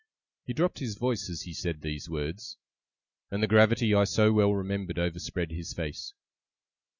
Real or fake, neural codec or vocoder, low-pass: real; none; 7.2 kHz